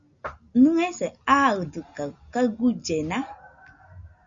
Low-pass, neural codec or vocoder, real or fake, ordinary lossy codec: 7.2 kHz; none; real; Opus, 64 kbps